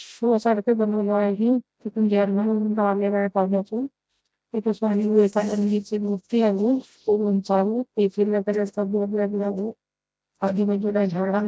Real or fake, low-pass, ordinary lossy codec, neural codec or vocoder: fake; none; none; codec, 16 kHz, 0.5 kbps, FreqCodec, smaller model